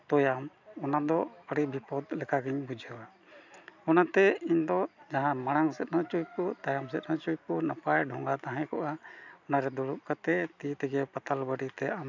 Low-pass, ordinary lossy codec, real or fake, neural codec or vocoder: 7.2 kHz; none; real; none